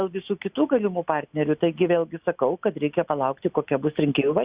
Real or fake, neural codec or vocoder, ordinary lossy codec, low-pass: real; none; Opus, 64 kbps; 3.6 kHz